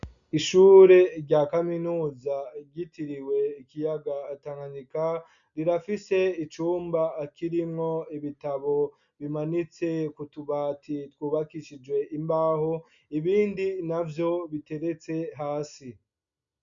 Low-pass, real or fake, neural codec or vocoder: 7.2 kHz; real; none